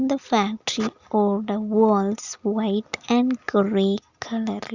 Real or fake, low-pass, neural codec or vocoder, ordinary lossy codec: real; 7.2 kHz; none; Opus, 64 kbps